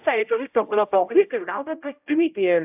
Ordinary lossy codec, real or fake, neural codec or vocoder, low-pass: AAC, 32 kbps; fake; codec, 16 kHz, 0.5 kbps, X-Codec, HuBERT features, trained on general audio; 3.6 kHz